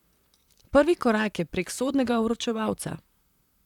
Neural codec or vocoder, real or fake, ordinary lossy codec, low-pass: vocoder, 44.1 kHz, 128 mel bands, Pupu-Vocoder; fake; none; 19.8 kHz